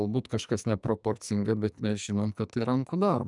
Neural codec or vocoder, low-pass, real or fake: codec, 44.1 kHz, 2.6 kbps, SNAC; 10.8 kHz; fake